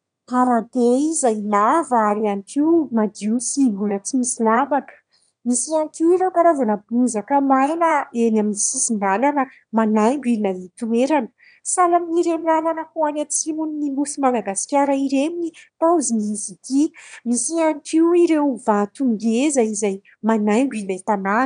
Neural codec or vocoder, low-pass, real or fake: autoencoder, 22.05 kHz, a latent of 192 numbers a frame, VITS, trained on one speaker; 9.9 kHz; fake